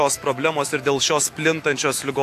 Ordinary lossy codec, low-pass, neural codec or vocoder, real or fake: AAC, 64 kbps; 14.4 kHz; autoencoder, 48 kHz, 128 numbers a frame, DAC-VAE, trained on Japanese speech; fake